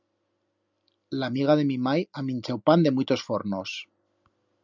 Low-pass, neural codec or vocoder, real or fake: 7.2 kHz; none; real